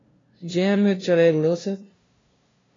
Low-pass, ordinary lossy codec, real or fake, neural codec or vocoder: 7.2 kHz; AAC, 32 kbps; fake; codec, 16 kHz, 0.5 kbps, FunCodec, trained on LibriTTS, 25 frames a second